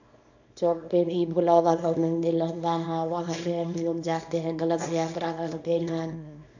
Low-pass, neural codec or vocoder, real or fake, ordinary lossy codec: 7.2 kHz; codec, 24 kHz, 0.9 kbps, WavTokenizer, small release; fake; AAC, 48 kbps